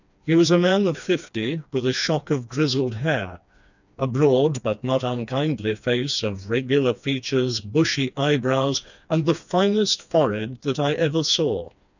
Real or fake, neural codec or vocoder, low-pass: fake; codec, 16 kHz, 2 kbps, FreqCodec, smaller model; 7.2 kHz